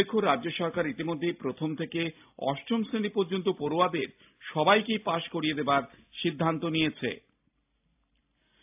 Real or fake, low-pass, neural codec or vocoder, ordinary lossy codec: real; 3.6 kHz; none; AAC, 32 kbps